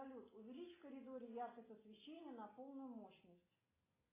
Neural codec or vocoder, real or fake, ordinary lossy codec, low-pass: none; real; AAC, 16 kbps; 3.6 kHz